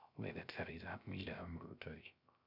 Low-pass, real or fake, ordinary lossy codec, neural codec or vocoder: 5.4 kHz; fake; none; codec, 16 kHz, 0.3 kbps, FocalCodec